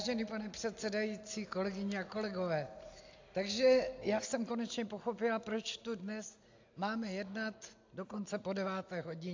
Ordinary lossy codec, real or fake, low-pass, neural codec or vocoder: AAC, 48 kbps; real; 7.2 kHz; none